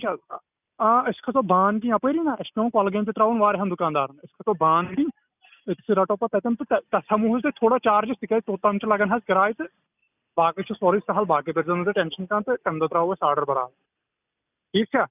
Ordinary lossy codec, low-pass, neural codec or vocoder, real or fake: none; 3.6 kHz; none; real